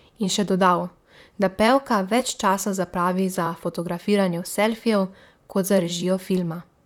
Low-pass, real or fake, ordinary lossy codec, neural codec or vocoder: 19.8 kHz; fake; none; vocoder, 44.1 kHz, 128 mel bands, Pupu-Vocoder